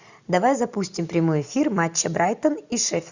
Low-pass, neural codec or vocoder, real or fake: 7.2 kHz; none; real